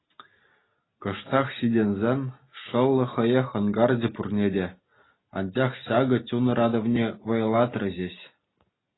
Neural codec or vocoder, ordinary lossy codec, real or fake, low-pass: none; AAC, 16 kbps; real; 7.2 kHz